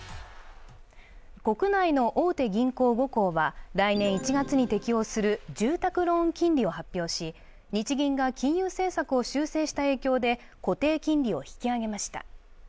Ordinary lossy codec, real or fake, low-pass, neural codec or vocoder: none; real; none; none